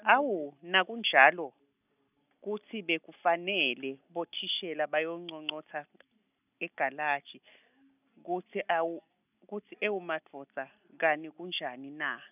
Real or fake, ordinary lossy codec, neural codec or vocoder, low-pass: real; none; none; 3.6 kHz